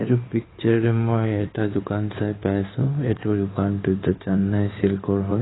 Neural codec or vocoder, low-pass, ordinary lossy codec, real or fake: codec, 16 kHz in and 24 kHz out, 2.2 kbps, FireRedTTS-2 codec; 7.2 kHz; AAC, 16 kbps; fake